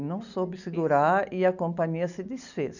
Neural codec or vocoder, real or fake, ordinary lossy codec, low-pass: none; real; none; 7.2 kHz